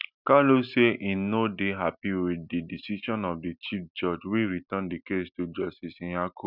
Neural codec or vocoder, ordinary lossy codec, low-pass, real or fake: none; none; 5.4 kHz; real